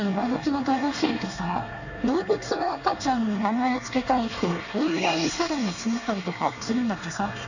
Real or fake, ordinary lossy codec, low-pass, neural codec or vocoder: fake; none; 7.2 kHz; codec, 24 kHz, 1 kbps, SNAC